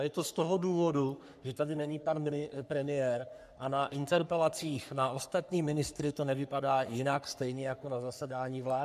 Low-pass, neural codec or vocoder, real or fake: 14.4 kHz; codec, 44.1 kHz, 3.4 kbps, Pupu-Codec; fake